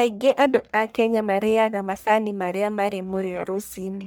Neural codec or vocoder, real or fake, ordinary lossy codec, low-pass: codec, 44.1 kHz, 1.7 kbps, Pupu-Codec; fake; none; none